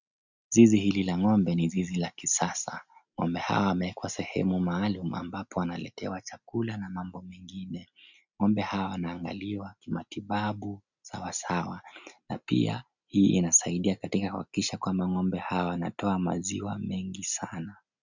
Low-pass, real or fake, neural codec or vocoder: 7.2 kHz; real; none